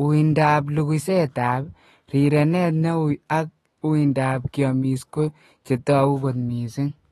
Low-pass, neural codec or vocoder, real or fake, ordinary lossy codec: 19.8 kHz; autoencoder, 48 kHz, 128 numbers a frame, DAC-VAE, trained on Japanese speech; fake; AAC, 32 kbps